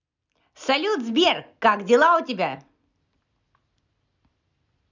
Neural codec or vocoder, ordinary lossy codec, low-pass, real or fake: none; none; 7.2 kHz; real